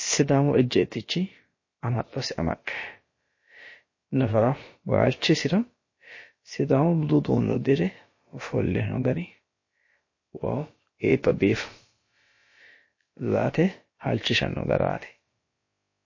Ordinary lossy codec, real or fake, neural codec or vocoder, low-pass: MP3, 32 kbps; fake; codec, 16 kHz, about 1 kbps, DyCAST, with the encoder's durations; 7.2 kHz